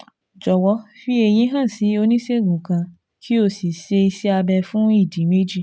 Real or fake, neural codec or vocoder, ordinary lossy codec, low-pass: real; none; none; none